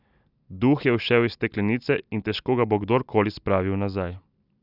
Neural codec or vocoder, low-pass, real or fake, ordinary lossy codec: none; 5.4 kHz; real; none